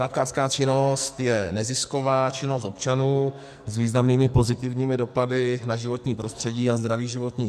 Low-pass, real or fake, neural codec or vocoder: 14.4 kHz; fake; codec, 44.1 kHz, 2.6 kbps, SNAC